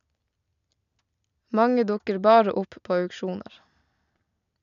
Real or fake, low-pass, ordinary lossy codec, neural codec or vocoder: real; 7.2 kHz; none; none